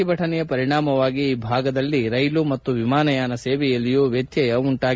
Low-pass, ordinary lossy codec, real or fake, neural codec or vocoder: none; none; real; none